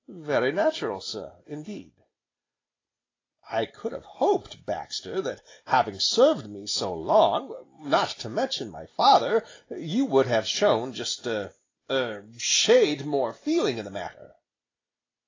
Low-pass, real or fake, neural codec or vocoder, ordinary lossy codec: 7.2 kHz; real; none; AAC, 32 kbps